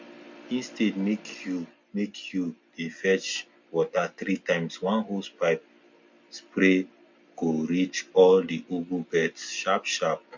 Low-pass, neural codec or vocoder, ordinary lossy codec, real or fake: 7.2 kHz; none; MP3, 48 kbps; real